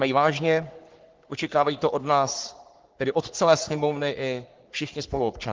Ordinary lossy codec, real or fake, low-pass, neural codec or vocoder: Opus, 16 kbps; fake; 7.2 kHz; codec, 44.1 kHz, 3.4 kbps, Pupu-Codec